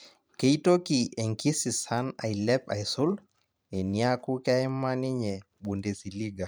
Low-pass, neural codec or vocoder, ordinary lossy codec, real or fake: none; vocoder, 44.1 kHz, 128 mel bands every 512 samples, BigVGAN v2; none; fake